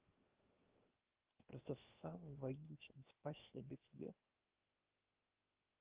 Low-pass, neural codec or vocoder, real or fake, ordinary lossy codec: 3.6 kHz; codec, 16 kHz, 0.7 kbps, FocalCodec; fake; Opus, 32 kbps